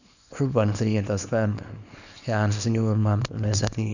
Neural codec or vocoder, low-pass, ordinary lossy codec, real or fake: codec, 24 kHz, 0.9 kbps, WavTokenizer, small release; 7.2 kHz; none; fake